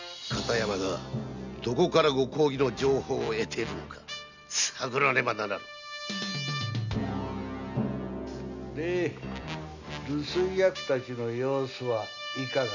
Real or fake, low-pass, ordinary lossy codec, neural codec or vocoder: real; 7.2 kHz; none; none